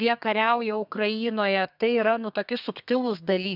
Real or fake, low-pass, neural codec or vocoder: fake; 5.4 kHz; codec, 32 kHz, 1.9 kbps, SNAC